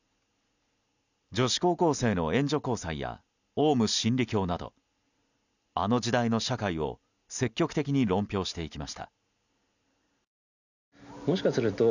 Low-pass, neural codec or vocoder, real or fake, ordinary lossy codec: 7.2 kHz; none; real; none